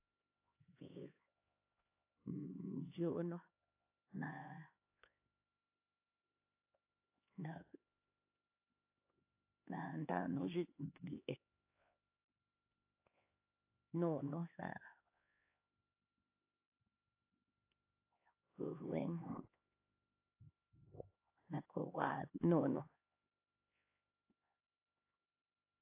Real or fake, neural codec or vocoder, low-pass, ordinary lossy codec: fake; codec, 16 kHz, 2 kbps, X-Codec, HuBERT features, trained on LibriSpeech; 3.6 kHz; AAC, 24 kbps